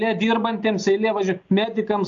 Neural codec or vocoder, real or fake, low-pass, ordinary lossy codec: none; real; 7.2 kHz; AAC, 64 kbps